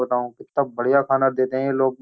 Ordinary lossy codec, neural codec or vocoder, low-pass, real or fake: none; none; none; real